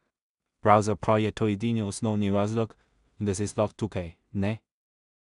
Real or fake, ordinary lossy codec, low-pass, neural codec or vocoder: fake; none; 10.8 kHz; codec, 16 kHz in and 24 kHz out, 0.4 kbps, LongCat-Audio-Codec, two codebook decoder